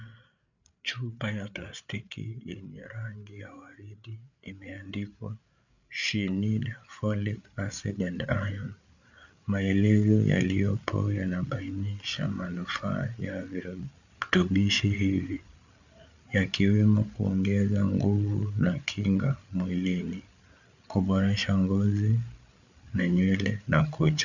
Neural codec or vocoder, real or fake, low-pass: codec, 16 kHz, 8 kbps, FreqCodec, larger model; fake; 7.2 kHz